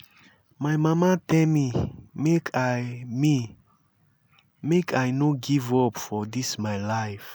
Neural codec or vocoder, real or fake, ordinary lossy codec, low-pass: none; real; none; none